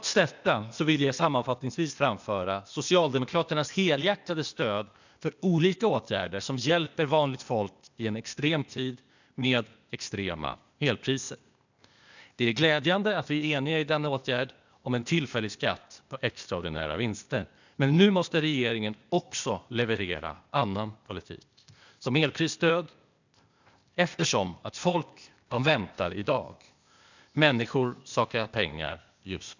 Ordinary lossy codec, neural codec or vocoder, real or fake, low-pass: none; codec, 16 kHz, 0.8 kbps, ZipCodec; fake; 7.2 kHz